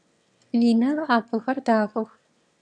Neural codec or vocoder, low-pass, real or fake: autoencoder, 22.05 kHz, a latent of 192 numbers a frame, VITS, trained on one speaker; 9.9 kHz; fake